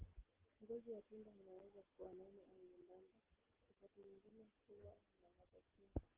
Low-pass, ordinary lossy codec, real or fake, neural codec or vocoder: 3.6 kHz; Opus, 16 kbps; real; none